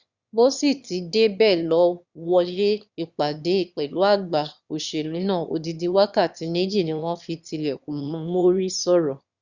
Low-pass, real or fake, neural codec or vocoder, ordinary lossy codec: 7.2 kHz; fake; autoencoder, 22.05 kHz, a latent of 192 numbers a frame, VITS, trained on one speaker; Opus, 64 kbps